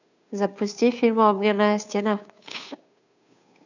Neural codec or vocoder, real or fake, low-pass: codec, 16 kHz, 2 kbps, FunCodec, trained on Chinese and English, 25 frames a second; fake; 7.2 kHz